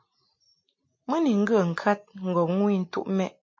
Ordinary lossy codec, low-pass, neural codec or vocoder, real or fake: MP3, 32 kbps; 7.2 kHz; none; real